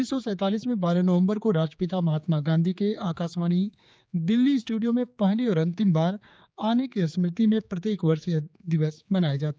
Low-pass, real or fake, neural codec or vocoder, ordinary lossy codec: none; fake; codec, 16 kHz, 4 kbps, X-Codec, HuBERT features, trained on general audio; none